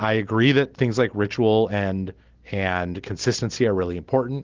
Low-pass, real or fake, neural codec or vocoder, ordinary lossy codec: 7.2 kHz; real; none; Opus, 16 kbps